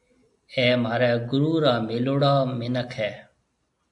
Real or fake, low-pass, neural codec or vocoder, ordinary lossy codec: real; 10.8 kHz; none; AAC, 64 kbps